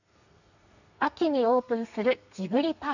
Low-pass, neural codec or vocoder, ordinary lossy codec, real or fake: 7.2 kHz; codec, 32 kHz, 1.9 kbps, SNAC; none; fake